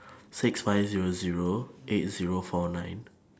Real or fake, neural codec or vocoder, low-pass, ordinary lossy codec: real; none; none; none